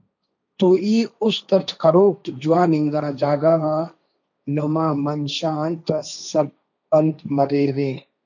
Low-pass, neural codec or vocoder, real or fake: 7.2 kHz; codec, 16 kHz, 1.1 kbps, Voila-Tokenizer; fake